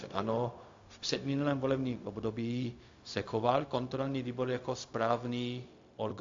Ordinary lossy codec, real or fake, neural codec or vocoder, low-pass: AAC, 48 kbps; fake; codec, 16 kHz, 0.4 kbps, LongCat-Audio-Codec; 7.2 kHz